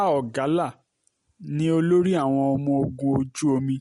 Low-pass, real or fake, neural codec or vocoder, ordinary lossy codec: 19.8 kHz; real; none; MP3, 48 kbps